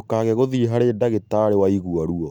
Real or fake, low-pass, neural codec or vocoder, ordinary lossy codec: real; 19.8 kHz; none; none